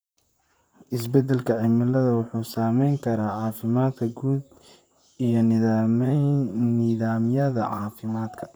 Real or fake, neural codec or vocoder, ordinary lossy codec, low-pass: fake; vocoder, 44.1 kHz, 128 mel bands, Pupu-Vocoder; none; none